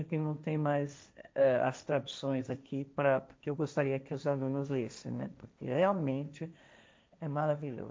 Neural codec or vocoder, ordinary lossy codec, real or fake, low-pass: codec, 16 kHz, 1.1 kbps, Voila-Tokenizer; none; fake; none